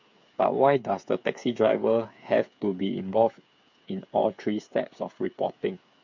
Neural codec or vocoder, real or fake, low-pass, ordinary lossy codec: codec, 16 kHz, 8 kbps, FreqCodec, smaller model; fake; 7.2 kHz; MP3, 48 kbps